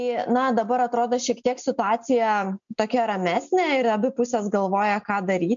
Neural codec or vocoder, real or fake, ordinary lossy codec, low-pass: none; real; MP3, 64 kbps; 7.2 kHz